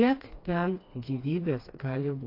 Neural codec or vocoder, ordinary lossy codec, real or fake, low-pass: codec, 16 kHz, 2 kbps, FreqCodec, smaller model; AAC, 32 kbps; fake; 5.4 kHz